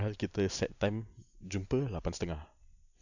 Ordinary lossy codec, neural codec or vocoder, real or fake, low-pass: none; none; real; 7.2 kHz